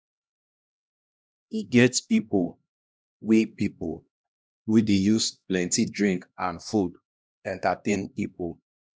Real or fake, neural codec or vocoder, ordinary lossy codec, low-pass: fake; codec, 16 kHz, 1 kbps, X-Codec, HuBERT features, trained on LibriSpeech; none; none